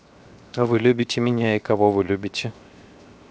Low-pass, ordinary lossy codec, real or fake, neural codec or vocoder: none; none; fake; codec, 16 kHz, 0.7 kbps, FocalCodec